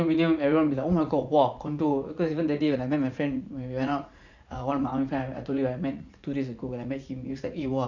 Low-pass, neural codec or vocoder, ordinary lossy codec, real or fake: 7.2 kHz; vocoder, 44.1 kHz, 80 mel bands, Vocos; none; fake